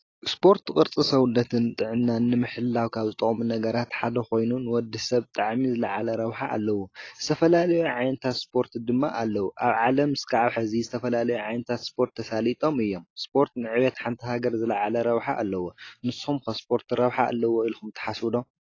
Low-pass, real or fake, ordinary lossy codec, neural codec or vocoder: 7.2 kHz; real; AAC, 32 kbps; none